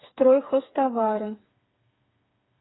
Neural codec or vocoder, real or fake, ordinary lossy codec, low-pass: codec, 16 kHz, 4 kbps, FreqCodec, smaller model; fake; AAC, 16 kbps; 7.2 kHz